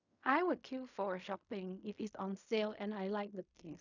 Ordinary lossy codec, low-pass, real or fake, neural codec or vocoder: none; 7.2 kHz; fake; codec, 16 kHz in and 24 kHz out, 0.4 kbps, LongCat-Audio-Codec, fine tuned four codebook decoder